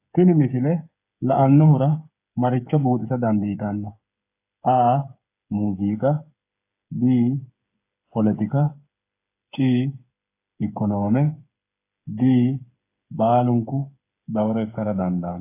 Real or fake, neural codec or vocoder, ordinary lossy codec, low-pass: fake; codec, 16 kHz, 8 kbps, FreqCodec, smaller model; AAC, 24 kbps; 3.6 kHz